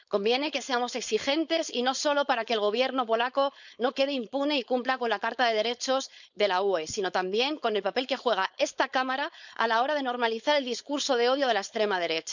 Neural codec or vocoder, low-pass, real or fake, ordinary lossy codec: codec, 16 kHz, 4.8 kbps, FACodec; 7.2 kHz; fake; none